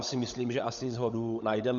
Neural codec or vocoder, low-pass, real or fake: codec, 16 kHz, 16 kbps, FunCodec, trained on LibriTTS, 50 frames a second; 7.2 kHz; fake